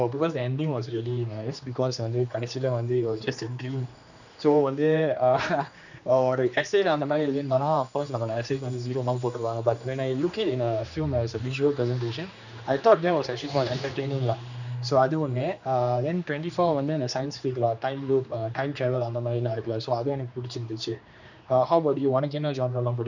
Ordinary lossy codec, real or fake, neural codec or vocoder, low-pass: none; fake; codec, 16 kHz, 2 kbps, X-Codec, HuBERT features, trained on general audio; 7.2 kHz